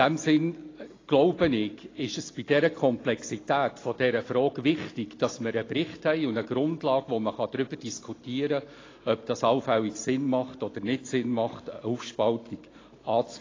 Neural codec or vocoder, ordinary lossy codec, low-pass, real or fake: none; AAC, 32 kbps; 7.2 kHz; real